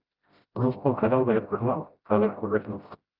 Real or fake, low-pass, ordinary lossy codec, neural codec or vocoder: fake; 5.4 kHz; Opus, 24 kbps; codec, 16 kHz, 0.5 kbps, FreqCodec, smaller model